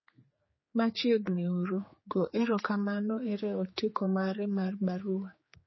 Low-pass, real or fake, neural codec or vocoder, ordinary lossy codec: 7.2 kHz; fake; codec, 16 kHz, 4 kbps, X-Codec, HuBERT features, trained on general audio; MP3, 24 kbps